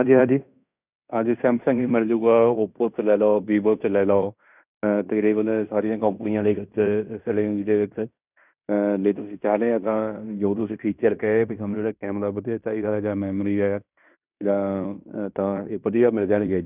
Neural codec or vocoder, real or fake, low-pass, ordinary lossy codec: codec, 16 kHz in and 24 kHz out, 0.9 kbps, LongCat-Audio-Codec, fine tuned four codebook decoder; fake; 3.6 kHz; none